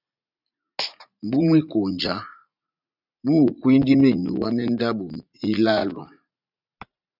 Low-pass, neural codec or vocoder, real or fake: 5.4 kHz; vocoder, 44.1 kHz, 80 mel bands, Vocos; fake